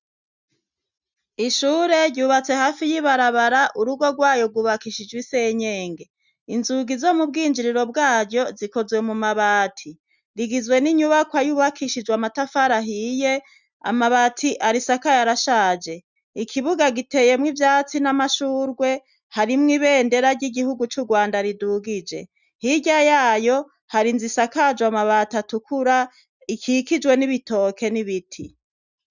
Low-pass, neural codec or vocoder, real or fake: 7.2 kHz; none; real